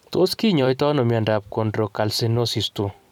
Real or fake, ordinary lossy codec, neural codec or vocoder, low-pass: fake; none; vocoder, 44.1 kHz, 128 mel bands every 256 samples, BigVGAN v2; 19.8 kHz